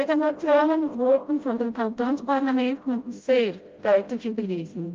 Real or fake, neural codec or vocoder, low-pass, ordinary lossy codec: fake; codec, 16 kHz, 0.5 kbps, FreqCodec, smaller model; 7.2 kHz; Opus, 32 kbps